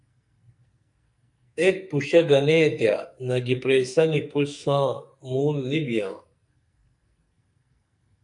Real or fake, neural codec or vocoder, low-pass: fake; codec, 44.1 kHz, 2.6 kbps, SNAC; 10.8 kHz